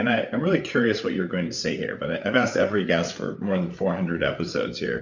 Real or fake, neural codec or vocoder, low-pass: fake; codec, 16 kHz, 8 kbps, FreqCodec, larger model; 7.2 kHz